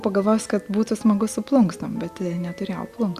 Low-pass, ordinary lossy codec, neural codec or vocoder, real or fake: 14.4 kHz; Opus, 64 kbps; none; real